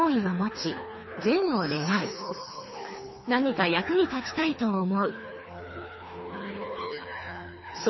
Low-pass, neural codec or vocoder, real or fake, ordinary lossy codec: 7.2 kHz; codec, 24 kHz, 3 kbps, HILCodec; fake; MP3, 24 kbps